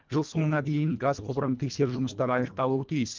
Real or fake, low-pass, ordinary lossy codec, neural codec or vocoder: fake; 7.2 kHz; Opus, 24 kbps; codec, 24 kHz, 1.5 kbps, HILCodec